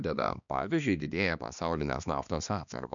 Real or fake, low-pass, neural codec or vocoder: fake; 7.2 kHz; codec, 16 kHz, 2 kbps, X-Codec, HuBERT features, trained on balanced general audio